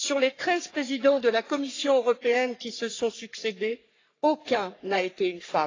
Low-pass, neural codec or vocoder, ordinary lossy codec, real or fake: 7.2 kHz; codec, 44.1 kHz, 3.4 kbps, Pupu-Codec; AAC, 32 kbps; fake